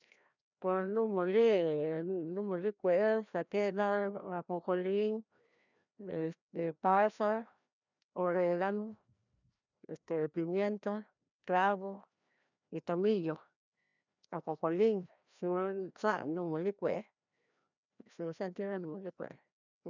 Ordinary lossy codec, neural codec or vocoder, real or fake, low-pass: none; codec, 16 kHz, 1 kbps, FreqCodec, larger model; fake; 7.2 kHz